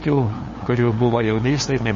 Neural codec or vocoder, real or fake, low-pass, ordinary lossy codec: codec, 16 kHz, 2 kbps, FunCodec, trained on LibriTTS, 25 frames a second; fake; 7.2 kHz; MP3, 32 kbps